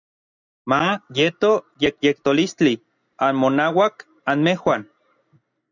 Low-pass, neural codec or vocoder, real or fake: 7.2 kHz; none; real